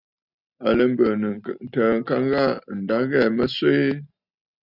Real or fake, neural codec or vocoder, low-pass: real; none; 5.4 kHz